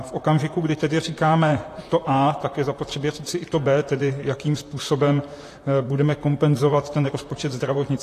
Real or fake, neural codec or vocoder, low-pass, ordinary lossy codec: fake; vocoder, 44.1 kHz, 128 mel bands, Pupu-Vocoder; 14.4 kHz; AAC, 48 kbps